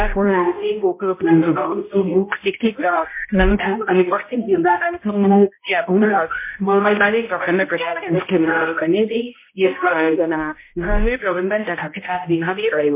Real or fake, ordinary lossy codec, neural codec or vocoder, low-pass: fake; MP3, 24 kbps; codec, 16 kHz, 0.5 kbps, X-Codec, HuBERT features, trained on balanced general audio; 3.6 kHz